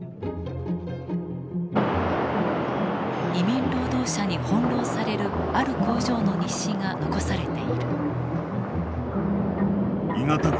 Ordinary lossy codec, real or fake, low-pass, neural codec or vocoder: none; real; none; none